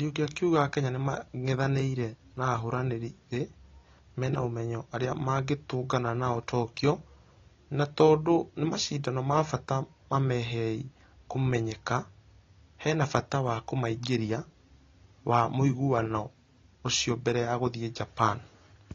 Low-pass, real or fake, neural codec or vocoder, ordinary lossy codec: 7.2 kHz; real; none; AAC, 32 kbps